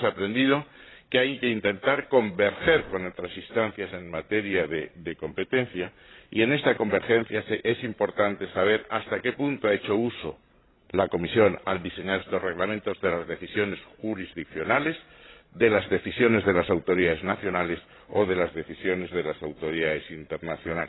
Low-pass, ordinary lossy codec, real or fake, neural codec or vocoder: 7.2 kHz; AAC, 16 kbps; fake; codec, 16 kHz, 16 kbps, FreqCodec, larger model